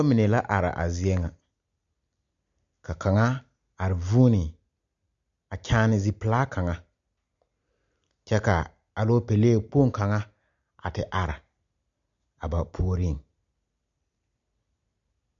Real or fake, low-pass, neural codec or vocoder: real; 7.2 kHz; none